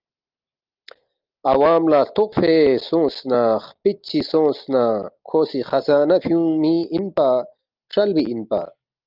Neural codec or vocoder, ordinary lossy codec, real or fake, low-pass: none; Opus, 24 kbps; real; 5.4 kHz